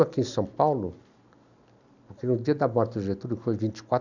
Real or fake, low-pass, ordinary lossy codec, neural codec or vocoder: real; 7.2 kHz; none; none